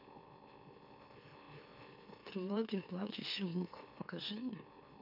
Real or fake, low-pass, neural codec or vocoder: fake; 5.4 kHz; autoencoder, 44.1 kHz, a latent of 192 numbers a frame, MeloTTS